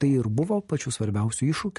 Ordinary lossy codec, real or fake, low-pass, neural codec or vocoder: MP3, 48 kbps; real; 14.4 kHz; none